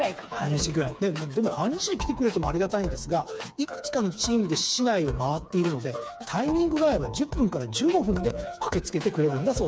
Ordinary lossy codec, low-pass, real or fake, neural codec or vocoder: none; none; fake; codec, 16 kHz, 4 kbps, FreqCodec, smaller model